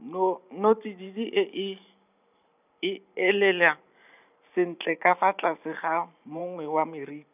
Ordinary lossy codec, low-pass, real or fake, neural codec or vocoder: none; 3.6 kHz; fake; vocoder, 44.1 kHz, 128 mel bands every 512 samples, BigVGAN v2